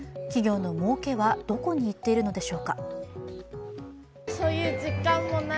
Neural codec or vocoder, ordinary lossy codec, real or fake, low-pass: none; none; real; none